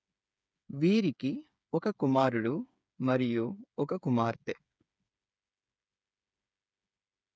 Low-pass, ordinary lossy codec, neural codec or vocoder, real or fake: none; none; codec, 16 kHz, 8 kbps, FreqCodec, smaller model; fake